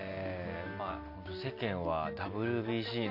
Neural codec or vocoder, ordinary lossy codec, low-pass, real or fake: none; none; 5.4 kHz; real